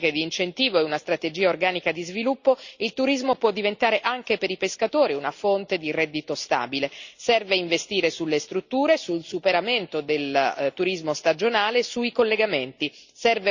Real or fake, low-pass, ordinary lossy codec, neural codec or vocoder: real; 7.2 kHz; Opus, 64 kbps; none